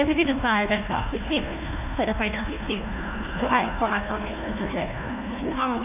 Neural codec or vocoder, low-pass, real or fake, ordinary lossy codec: codec, 16 kHz, 1 kbps, FunCodec, trained on Chinese and English, 50 frames a second; 3.6 kHz; fake; none